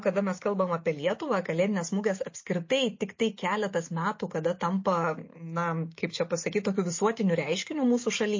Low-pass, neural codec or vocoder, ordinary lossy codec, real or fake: 7.2 kHz; none; MP3, 32 kbps; real